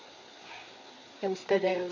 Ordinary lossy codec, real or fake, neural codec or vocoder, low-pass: MP3, 64 kbps; fake; codec, 16 kHz, 4 kbps, FreqCodec, larger model; 7.2 kHz